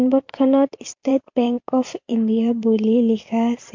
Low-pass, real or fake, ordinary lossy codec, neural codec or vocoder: 7.2 kHz; fake; MP3, 48 kbps; vocoder, 44.1 kHz, 128 mel bands every 512 samples, BigVGAN v2